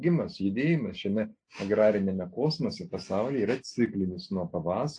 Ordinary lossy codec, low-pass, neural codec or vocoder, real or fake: AAC, 48 kbps; 9.9 kHz; none; real